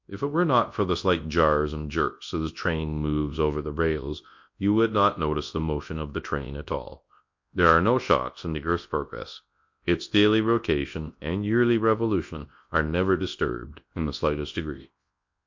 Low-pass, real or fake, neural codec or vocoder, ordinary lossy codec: 7.2 kHz; fake; codec, 24 kHz, 0.9 kbps, WavTokenizer, large speech release; MP3, 48 kbps